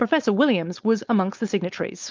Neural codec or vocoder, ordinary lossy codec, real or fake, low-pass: codec, 16 kHz, 4.8 kbps, FACodec; Opus, 24 kbps; fake; 7.2 kHz